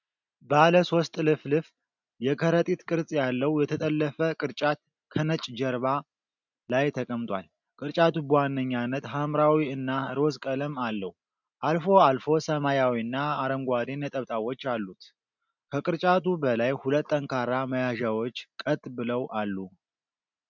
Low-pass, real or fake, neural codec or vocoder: 7.2 kHz; real; none